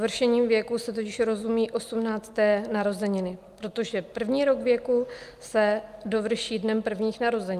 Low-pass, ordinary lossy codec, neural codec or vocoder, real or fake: 14.4 kHz; Opus, 32 kbps; none; real